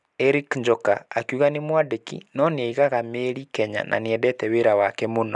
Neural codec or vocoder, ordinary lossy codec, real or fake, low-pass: none; none; real; 10.8 kHz